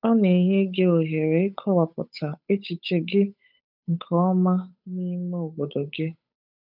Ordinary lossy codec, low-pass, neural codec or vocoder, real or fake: none; 5.4 kHz; codec, 16 kHz, 8 kbps, FunCodec, trained on Chinese and English, 25 frames a second; fake